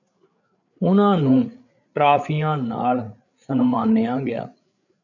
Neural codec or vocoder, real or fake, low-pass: codec, 16 kHz, 8 kbps, FreqCodec, larger model; fake; 7.2 kHz